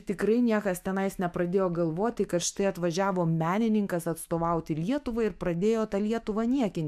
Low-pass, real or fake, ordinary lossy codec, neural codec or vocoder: 14.4 kHz; fake; MP3, 96 kbps; autoencoder, 48 kHz, 128 numbers a frame, DAC-VAE, trained on Japanese speech